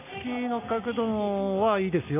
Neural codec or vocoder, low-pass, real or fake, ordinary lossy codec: none; 3.6 kHz; real; none